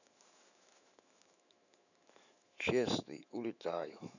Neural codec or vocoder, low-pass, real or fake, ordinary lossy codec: autoencoder, 48 kHz, 128 numbers a frame, DAC-VAE, trained on Japanese speech; 7.2 kHz; fake; none